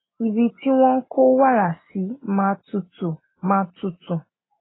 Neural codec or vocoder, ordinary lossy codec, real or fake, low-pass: none; AAC, 16 kbps; real; 7.2 kHz